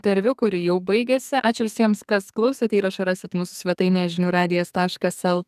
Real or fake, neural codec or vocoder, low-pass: fake; codec, 44.1 kHz, 2.6 kbps, SNAC; 14.4 kHz